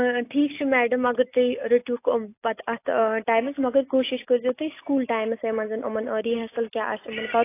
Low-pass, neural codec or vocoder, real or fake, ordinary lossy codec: 3.6 kHz; none; real; AAC, 24 kbps